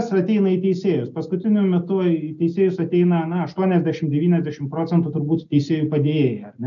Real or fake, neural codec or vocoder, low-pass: real; none; 7.2 kHz